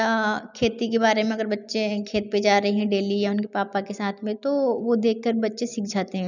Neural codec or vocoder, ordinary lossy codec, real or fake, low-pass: none; none; real; 7.2 kHz